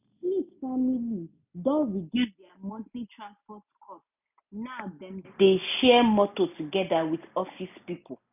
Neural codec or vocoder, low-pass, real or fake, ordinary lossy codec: none; 3.6 kHz; real; none